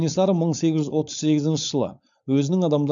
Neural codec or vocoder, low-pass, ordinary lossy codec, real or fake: codec, 16 kHz, 4.8 kbps, FACodec; 7.2 kHz; none; fake